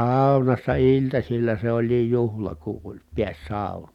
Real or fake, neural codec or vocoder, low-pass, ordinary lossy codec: real; none; 19.8 kHz; none